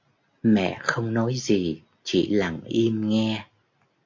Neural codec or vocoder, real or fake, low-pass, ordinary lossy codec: none; real; 7.2 kHz; MP3, 48 kbps